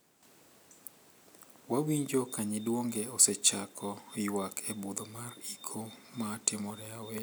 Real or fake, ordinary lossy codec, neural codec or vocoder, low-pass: real; none; none; none